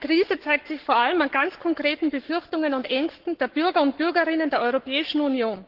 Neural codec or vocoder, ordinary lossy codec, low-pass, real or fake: codec, 44.1 kHz, 7.8 kbps, Pupu-Codec; Opus, 16 kbps; 5.4 kHz; fake